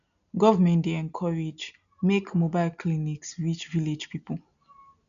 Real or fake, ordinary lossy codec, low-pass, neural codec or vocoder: real; none; 7.2 kHz; none